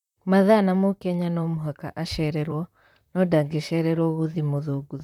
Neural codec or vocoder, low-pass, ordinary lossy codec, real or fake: vocoder, 44.1 kHz, 128 mel bands, Pupu-Vocoder; 19.8 kHz; none; fake